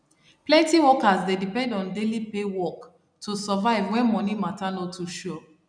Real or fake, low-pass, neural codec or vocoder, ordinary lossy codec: real; 9.9 kHz; none; none